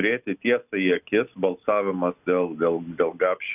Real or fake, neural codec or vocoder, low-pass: real; none; 3.6 kHz